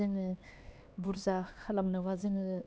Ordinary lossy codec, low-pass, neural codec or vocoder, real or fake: none; none; codec, 16 kHz, 2 kbps, X-Codec, HuBERT features, trained on LibriSpeech; fake